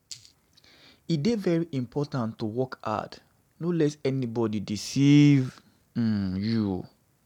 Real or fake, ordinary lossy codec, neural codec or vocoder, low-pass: real; none; none; 19.8 kHz